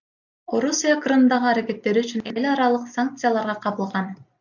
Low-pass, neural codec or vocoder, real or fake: 7.2 kHz; none; real